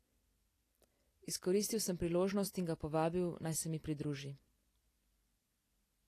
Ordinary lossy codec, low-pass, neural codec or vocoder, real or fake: AAC, 48 kbps; 14.4 kHz; none; real